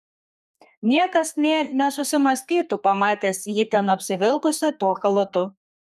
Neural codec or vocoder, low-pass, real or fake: codec, 32 kHz, 1.9 kbps, SNAC; 14.4 kHz; fake